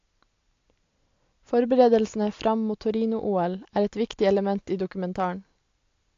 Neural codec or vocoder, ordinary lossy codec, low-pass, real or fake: none; AAC, 48 kbps; 7.2 kHz; real